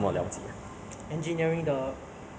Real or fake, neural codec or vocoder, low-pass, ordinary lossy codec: real; none; none; none